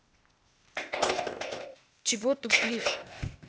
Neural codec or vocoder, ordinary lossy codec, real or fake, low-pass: codec, 16 kHz, 0.8 kbps, ZipCodec; none; fake; none